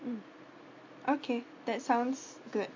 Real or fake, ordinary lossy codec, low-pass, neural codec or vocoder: real; MP3, 48 kbps; 7.2 kHz; none